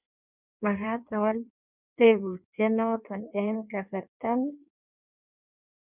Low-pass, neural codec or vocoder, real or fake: 3.6 kHz; codec, 16 kHz in and 24 kHz out, 1.1 kbps, FireRedTTS-2 codec; fake